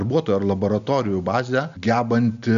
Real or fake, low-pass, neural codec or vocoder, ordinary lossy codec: real; 7.2 kHz; none; AAC, 96 kbps